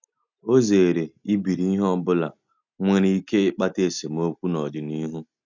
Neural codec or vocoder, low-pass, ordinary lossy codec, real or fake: none; 7.2 kHz; none; real